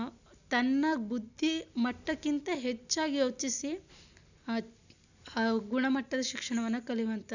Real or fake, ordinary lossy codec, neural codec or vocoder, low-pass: real; none; none; 7.2 kHz